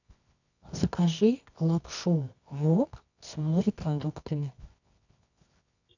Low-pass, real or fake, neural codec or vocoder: 7.2 kHz; fake; codec, 24 kHz, 0.9 kbps, WavTokenizer, medium music audio release